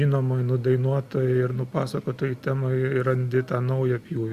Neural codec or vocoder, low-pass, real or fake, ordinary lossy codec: none; 14.4 kHz; real; Opus, 64 kbps